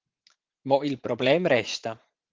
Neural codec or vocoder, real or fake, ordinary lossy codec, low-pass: vocoder, 44.1 kHz, 80 mel bands, Vocos; fake; Opus, 16 kbps; 7.2 kHz